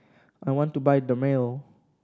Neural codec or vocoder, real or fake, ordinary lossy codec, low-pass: none; real; none; none